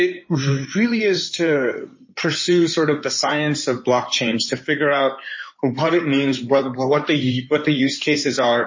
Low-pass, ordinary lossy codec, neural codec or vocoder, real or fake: 7.2 kHz; MP3, 32 kbps; codec, 16 kHz in and 24 kHz out, 2.2 kbps, FireRedTTS-2 codec; fake